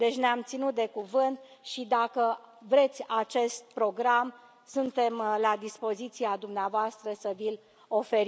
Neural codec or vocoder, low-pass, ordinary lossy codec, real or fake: none; none; none; real